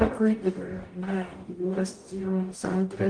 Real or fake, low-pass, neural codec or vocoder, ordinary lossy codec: fake; 9.9 kHz; codec, 44.1 kHz, 0.9 kbps, DAC; Opus, 24 kbps